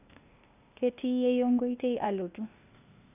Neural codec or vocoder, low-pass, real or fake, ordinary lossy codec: codec, 16 kHz, 0.8 kbps, ZipCodec; 3.6 kHz; fake; none